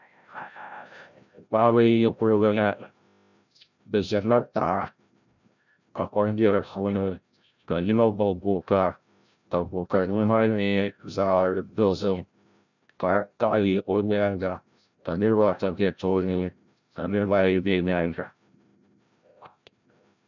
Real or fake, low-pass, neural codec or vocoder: fake; 7.2 kHz; codec, 16 kHz, 0.5 kbps, FreqCodec, larger model